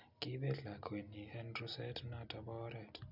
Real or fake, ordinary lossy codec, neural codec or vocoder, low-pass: real; none; none; 5.4 kHz